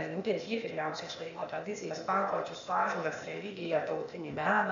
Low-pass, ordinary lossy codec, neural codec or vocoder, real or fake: 7.2 kHz; MP3, 64 kbps; codec, 16 kHz, 0.8 kbps, ZipCodec; fake